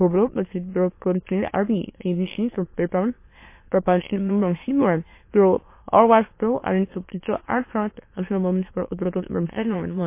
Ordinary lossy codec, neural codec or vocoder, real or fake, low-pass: MP3, 24 kbps; autoencoder, 22.05 kHz, a latent of 192 numbers a frame, VITS, trained on many speakers; fake; 3.6 kHz